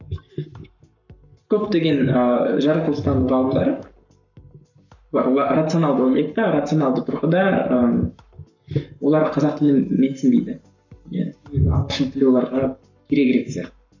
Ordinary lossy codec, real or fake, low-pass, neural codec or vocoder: none; fake; 7.2 kHz; codec, 44.1 kHz, 7.8 kbps, Pupu-Codec